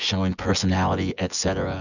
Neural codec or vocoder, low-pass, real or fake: vocoder, 24 kHz, 100 mel bands, Vocos; 7.2 kHz; fake